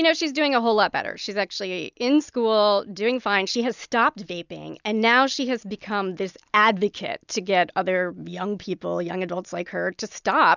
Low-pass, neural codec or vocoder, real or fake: 7.2 kHz; none; real